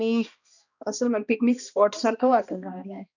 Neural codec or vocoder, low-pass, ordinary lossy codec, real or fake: codec, 16 kHz, 1 kbps, X-Codec, HuBERT features, trained on balanced general audio; 7.2 kHz; none; fake